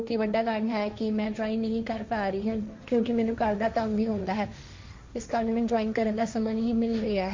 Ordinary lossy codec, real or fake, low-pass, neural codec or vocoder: MP3, 48 kbps; fake; 7.2 kHz; codec, 16 kHz, 1.1 kbps, Voila-Tokenizer